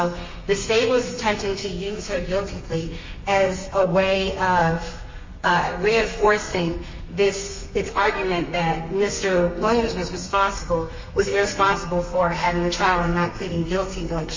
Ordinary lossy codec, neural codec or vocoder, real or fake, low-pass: MP3, 32 kbps; codec, 32 kHz, 1.9 kbps, SNAC; fake; 7.2 kHz